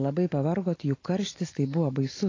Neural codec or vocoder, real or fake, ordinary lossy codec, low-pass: none; real; AAC, 32 kbps; 7.2 kHz